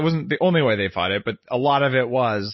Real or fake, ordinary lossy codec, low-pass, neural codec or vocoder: real; MP3, 24 kbps; 7.2 kHz; none